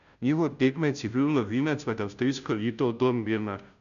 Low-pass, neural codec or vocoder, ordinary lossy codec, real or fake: 7.2 kHz; codec, 16 kHz, 0.5 kbps, FunCodec, trained on Chinese and English, 25 frames a second; MP3, 96 kbps; fake